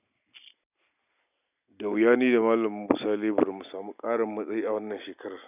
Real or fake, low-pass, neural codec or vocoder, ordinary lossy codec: real; 3.6 kHz; none; none